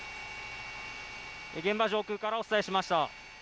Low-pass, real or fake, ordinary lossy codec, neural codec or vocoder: none; real; none; none